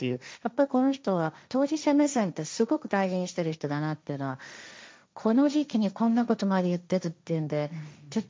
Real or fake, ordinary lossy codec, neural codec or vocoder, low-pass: fake; none; codec, 16 kHz, 1.1 kbps, Voila-Tokenizer; none